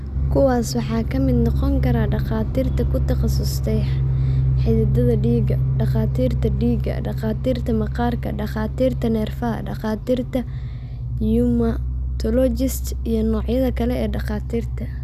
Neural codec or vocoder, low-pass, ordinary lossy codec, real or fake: none; 14.4 kHz; none; real